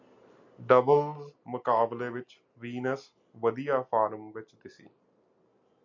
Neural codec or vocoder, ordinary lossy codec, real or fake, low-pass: none; MP3, 64 kbps; real; 7.2 kHz